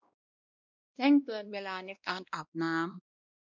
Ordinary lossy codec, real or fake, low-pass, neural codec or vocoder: none; fake; 7.2 kHz; codec, 16 kHz, 1 kbps, X-Codec, WavLM features, trained on Multilingual LibriSpeech